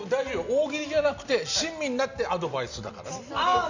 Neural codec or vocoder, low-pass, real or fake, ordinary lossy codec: none; 7.2 kHz; real; Opus, 64 kbps